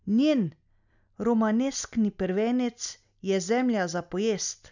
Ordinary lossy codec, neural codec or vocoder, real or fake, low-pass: none; none; real; 7.2 kHz